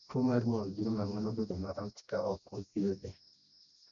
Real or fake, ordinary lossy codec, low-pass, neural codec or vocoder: fake; none; 7.2 kHz; codec, 16 kHz, 1 kbps, FreqCodec, smaller model